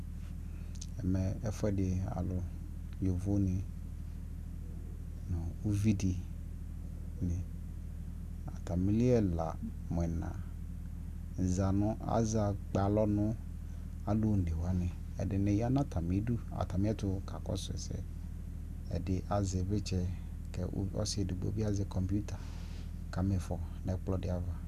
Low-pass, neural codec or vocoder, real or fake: 14.4 kHz; none; real